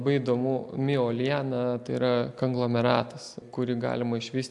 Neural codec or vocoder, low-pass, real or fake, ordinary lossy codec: none; 10.8 kHz; real; AAC, 64 kbps